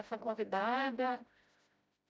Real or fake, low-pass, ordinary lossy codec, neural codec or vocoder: fake; none; none; codec, 16 kHz, 0.5 kbps, FreqCodec, smaller model